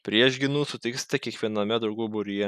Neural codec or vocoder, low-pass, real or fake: none; 14.4 kHz; real